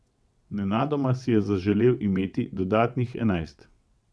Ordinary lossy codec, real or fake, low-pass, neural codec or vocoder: none; fake; none; vocoder, 22.05 kHz, 80 mel bands, WaveNeXt